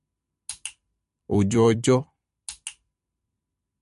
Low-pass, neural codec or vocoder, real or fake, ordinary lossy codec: 14.4 kHz; vocoder, 44.1 kHz, 128 mel bands every 256 samples, BigVGAN v2; fake; MP3, 48 kbps